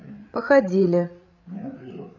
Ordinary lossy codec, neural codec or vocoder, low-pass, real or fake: none; codec, 16 kHz, 8 kbps, FreqCodec, larger model; 7.2 kHz; fake